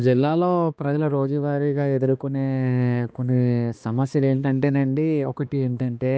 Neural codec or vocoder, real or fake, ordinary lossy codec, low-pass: codec, 16 kHz, 2 kbps, X-Codec, HuBERT features, trained on balanced general audio; fake; none; none